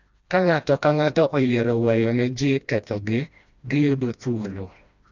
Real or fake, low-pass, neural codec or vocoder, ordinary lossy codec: fake; 7.2 kHz; codec, 16 kHz, 1 kbps, FreqCodec, smaller model; Opus, 64 kbps